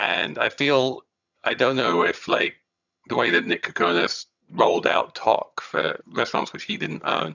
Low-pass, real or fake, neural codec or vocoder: 7.2 kHz; fake; vocoder, 22.05 kHz, 80 mel bands, HiFi-GAN